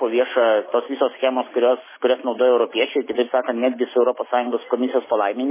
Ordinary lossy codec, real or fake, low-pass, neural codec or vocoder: MP3, 16 kbps; real; 3.6 kHz; none